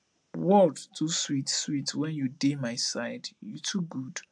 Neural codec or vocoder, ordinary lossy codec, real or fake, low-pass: none; AAC, 64 kbps; real; 9.9 kHz